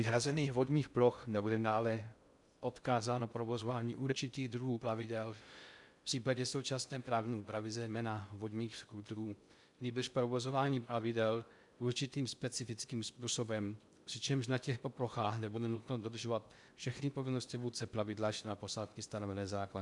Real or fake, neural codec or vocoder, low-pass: fake; codec, 16 kHz in and 24 kHz out, 0.6 kbps, FocalCodec, streaming, 2048 codes; 10.8 kHz